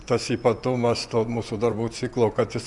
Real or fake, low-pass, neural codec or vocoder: fake; 10.8 kHz; vocoder, 24 kHz, 100 mel bands, Vocos